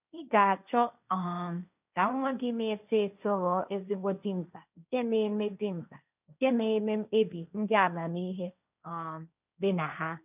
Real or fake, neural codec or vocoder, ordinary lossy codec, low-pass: fake; codec, 16 kHz, 1.1 kbps, Voila-Tokenizer; none; 3.6 kHz